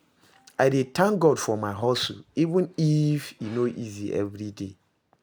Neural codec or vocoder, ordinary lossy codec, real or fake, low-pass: none; none; real; none